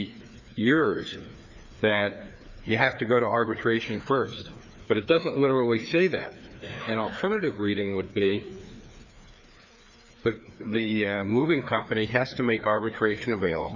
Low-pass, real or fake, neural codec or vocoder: 7.2 kHz; fake; codec, 16 kHz, 2 kbps, FreqCodec, larger model